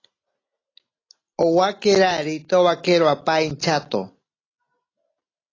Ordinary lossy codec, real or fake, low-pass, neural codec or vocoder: AAC, 32 kbps; real; 7.2 kHz; none